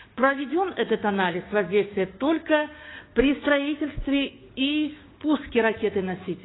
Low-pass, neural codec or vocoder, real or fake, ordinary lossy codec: 7.2 kHz; codec, 16 kHz, 6 kbps, DAC; fake; AAC, 16 kbps